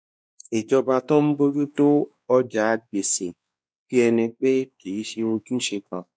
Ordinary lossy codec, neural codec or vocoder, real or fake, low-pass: none; codec, 16 kHz, 2 kbps, X-Codec, WavLM features, trained on Multilingual LibriSpeech; fake; none